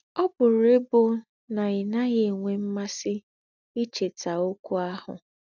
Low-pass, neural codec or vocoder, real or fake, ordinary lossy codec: 7.2 kHz; none; real; none